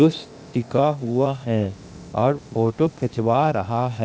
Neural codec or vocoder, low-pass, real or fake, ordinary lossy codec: codec, 16 kHz, 0.8 kbps, ZipCodec; none; fake; none